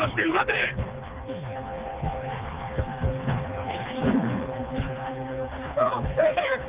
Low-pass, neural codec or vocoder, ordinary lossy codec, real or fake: 3.6 kHz; codec, 16 kHz, 2 kbps, FreqCodec, smaller model; Opus, 32 kbps; fake